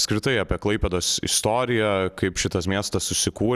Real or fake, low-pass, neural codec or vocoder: real; 14.4 kHz; none